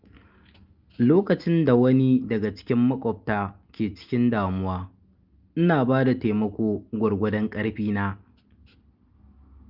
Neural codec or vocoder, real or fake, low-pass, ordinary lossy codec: none; real; 5.4 kHz; Opus, 24 kbps